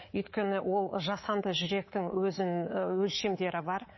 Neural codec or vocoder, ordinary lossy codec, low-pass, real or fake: codec, 24 kHz, 3.1 kbps, DualCodec; MP3, 24 kbps; 7.2 kHz; fake